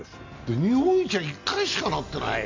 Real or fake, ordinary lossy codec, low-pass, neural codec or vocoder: real; MP3, 48 kbps; 7.2 kHz; none